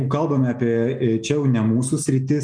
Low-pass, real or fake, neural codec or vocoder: 9.9 kHz; real; none